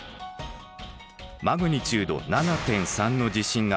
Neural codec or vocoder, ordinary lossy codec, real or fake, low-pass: none; none; real; none